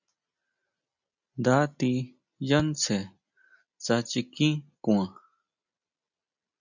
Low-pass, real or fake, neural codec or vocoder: 7.2 kHz; real; none